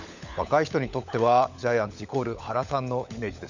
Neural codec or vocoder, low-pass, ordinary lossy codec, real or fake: codec, 16 kHz, 16 kbps, FunCodec, trained on LibriTTS, 50 frames a second; 7.2 kHz; none; fake